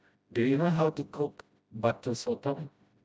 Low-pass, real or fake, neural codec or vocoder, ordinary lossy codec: none; fake; codec, 16 kHz, 0.5 kbps, FreqCodec, smaller model; none